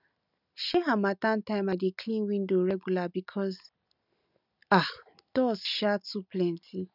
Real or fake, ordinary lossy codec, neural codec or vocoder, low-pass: real; none; none; 5.4 kHz